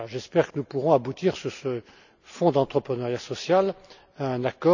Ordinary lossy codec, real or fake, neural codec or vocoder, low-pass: none; real; none; 7.2 kHz